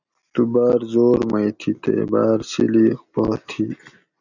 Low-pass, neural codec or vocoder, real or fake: 7.2 kHz; none; real